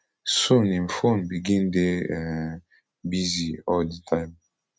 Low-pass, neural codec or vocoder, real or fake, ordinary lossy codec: none; none; real; none